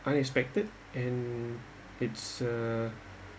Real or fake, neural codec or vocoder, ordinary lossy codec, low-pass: real; none; none; none